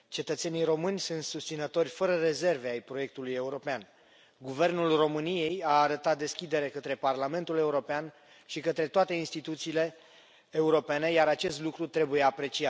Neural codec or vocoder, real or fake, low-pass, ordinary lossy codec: none; real; none; none